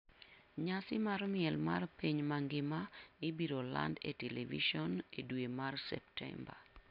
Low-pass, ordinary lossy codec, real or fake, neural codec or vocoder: 5.4 kHz; none; real; none